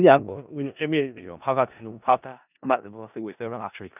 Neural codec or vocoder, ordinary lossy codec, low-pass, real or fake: codec, 16 kHz in and 24 kHz out, 0.4 kbps, LongCat-Audio-Codec, four codebook decoder; none; 3.6 kHz; fake